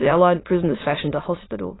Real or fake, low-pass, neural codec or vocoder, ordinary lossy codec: fake; 7.2 kHz; autoencoder, 22.05 kHz, a latent of 192 numbers a frame, VITS, trained on many speakers; AAC, 16 kbps